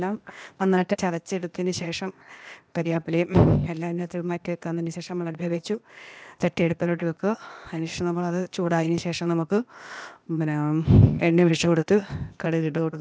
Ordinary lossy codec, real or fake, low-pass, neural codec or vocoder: none; fake; none; codec, 16 kHz, 0.8 kbps, ZipCodec